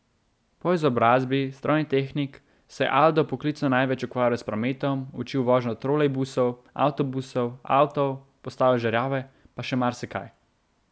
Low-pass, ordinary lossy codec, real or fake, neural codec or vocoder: none; none; real; none